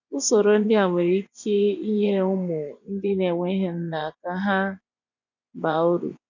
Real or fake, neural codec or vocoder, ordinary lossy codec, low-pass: fake; vocoder, 24 kHz, 100 mel bands, Vocos; none; 7.2 kHz